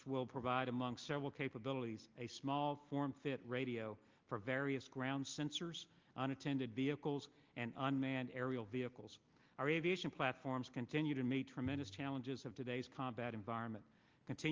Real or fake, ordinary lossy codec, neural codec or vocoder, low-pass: real; Opus, 24 kbps; none; 7.2 kHz